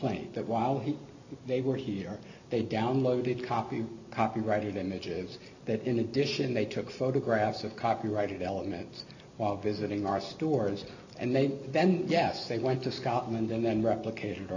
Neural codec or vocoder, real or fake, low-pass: none; real; 7.2 kHz